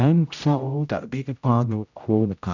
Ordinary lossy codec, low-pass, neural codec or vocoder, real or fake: none; 7.2 kHz; codec, 16 kHz, 0.5 kbps, X-Codec, HuBERT features, trained on general audio; fake